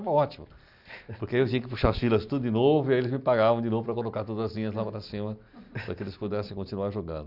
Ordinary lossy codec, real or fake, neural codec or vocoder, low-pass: none; real; none; 5.4 kHz